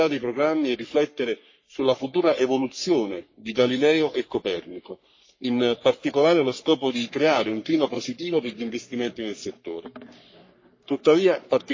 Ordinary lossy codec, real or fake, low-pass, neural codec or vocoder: MP3, 32 kbps; fake; 7.2 kHz; codec, 44.1 kHz, 3.4 kbps, Pupu-Codec